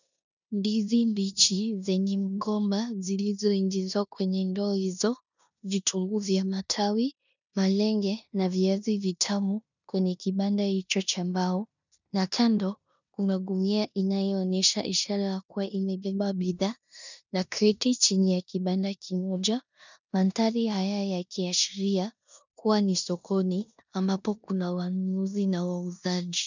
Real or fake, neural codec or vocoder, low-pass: fake; codec, 16 kHz in and 24 kHz out, 0.9 kbps, LongCat-Audio-Codec, four codebook decoder; 7.2 kHz